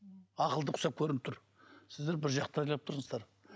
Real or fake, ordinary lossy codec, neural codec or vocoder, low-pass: real; none; none; none